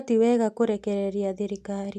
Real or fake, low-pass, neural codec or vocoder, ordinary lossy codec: real; 14.4 kHz; none; MP3, 96 kbps